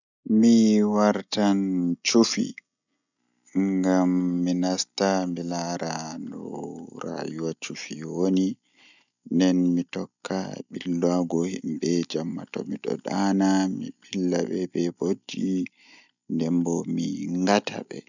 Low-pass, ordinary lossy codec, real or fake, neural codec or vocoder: 7.2 kHz; none; real; none